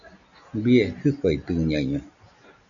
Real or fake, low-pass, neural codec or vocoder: real; 7.2 kHz; none